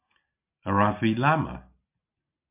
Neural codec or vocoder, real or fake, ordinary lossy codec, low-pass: none; real; MP3, 32 kbps; 3.6 kHz